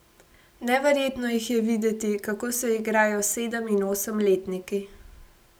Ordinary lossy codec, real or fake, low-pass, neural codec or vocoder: none; real; none; none